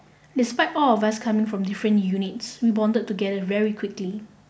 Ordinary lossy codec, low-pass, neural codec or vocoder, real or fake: none; none; none; real